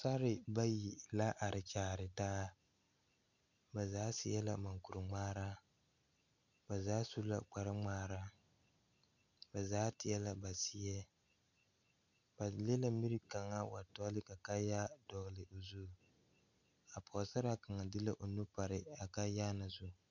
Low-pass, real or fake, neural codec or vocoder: 7.2 kHz; real; none